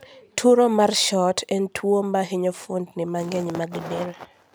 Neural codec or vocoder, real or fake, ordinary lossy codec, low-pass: none; real; none; none